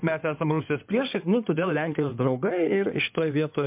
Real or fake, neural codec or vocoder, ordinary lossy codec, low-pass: fake; codec, 16 kHz in and 24 kHz out, 2.2 kbps, FireRedTTS-2 codec; MP3, 32 kbps; 3.6 kHz